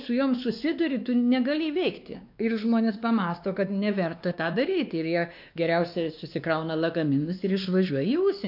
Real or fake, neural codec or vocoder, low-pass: fake; codec, 16 kHz, 2 kbps, X-Codec, WavLM features, trained on Multilingual LibriSpeech; 5.4 kHz